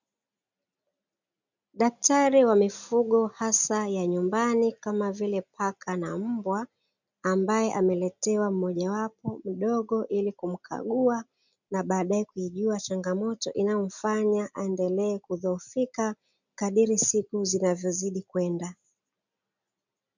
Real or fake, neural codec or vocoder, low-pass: real; none; 7.2 kHz